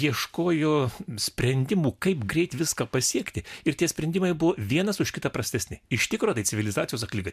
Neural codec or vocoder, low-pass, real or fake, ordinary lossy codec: none; 14.4 kHz; real; MP3, 96 kbps